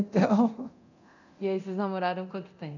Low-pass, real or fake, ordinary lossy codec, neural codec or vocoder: 7.2 kHz; fake; none; codec, 24 kHz, 0.9 kbps, DualCodec